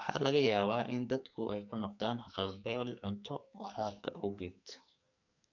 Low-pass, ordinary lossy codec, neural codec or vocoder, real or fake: 7.2 kHz; none; codec, 44.1 kHz, 2.6 kbps, SNAC; fake